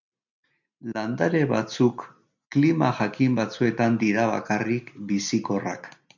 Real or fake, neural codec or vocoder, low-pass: real; none; 7.2 kHz